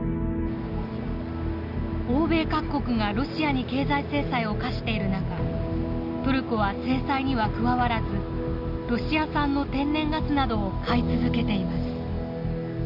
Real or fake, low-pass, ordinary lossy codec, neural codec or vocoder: real; 5.4 kHz; none; none